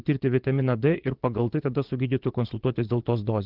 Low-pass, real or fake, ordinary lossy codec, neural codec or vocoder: 5.4 kHz; fake; Opus, 16 kbps; vocoder, 22.05 kHz, 80 mel bands, Vocos